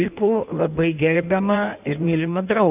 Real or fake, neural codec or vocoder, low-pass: fake; codec, 16 kHz in and 24 kHz out, 1.1 kbps, FireRedTTS-2 codec; 3.6 kHz